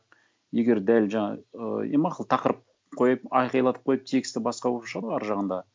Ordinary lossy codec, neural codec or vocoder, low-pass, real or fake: none; none; none; real